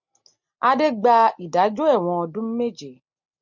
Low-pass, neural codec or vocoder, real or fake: 7.2 kHz; none; real